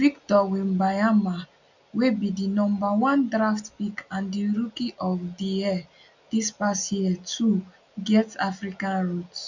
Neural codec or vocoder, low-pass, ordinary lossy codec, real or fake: none; 7.2 kHz; none; real